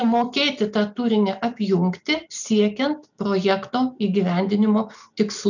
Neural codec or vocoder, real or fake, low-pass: none; real; 7.2 kHz